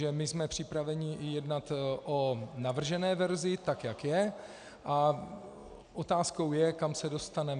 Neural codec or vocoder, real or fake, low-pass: none; real; 9.9 kHz